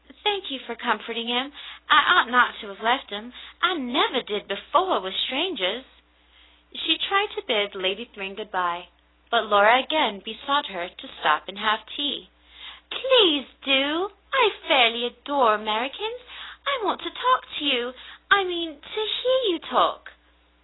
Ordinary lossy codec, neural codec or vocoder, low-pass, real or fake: AAC, 16 kbps; none; 7.2 kHz; real